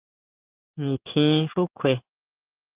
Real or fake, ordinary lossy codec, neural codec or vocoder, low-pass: fake; Opus, 32 kbps; codec, 16 kHz in and 24 kHz out, 1 kbps, XY-Tokenizer; 3.6 kHz